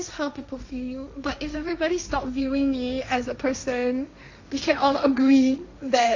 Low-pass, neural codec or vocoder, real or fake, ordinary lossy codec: 7.2 kHz; codec, 16 kHz, 1.1 kbps, Voila-Tokenizer; fake; AAC, 48 kbps